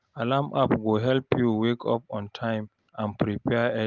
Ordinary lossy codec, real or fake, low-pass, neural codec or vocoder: Opus, 32 kbps; real; 7.2 kHz; none